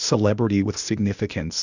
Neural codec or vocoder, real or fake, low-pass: codec, 16 kHz, 0.8 kbps, ZipCodec; fake; 7.2 kHz